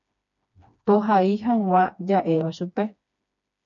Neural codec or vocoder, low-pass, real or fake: codec, 16 kHz, 2 kbps, FreqCodec, smaller model; 7.2 kHz; fake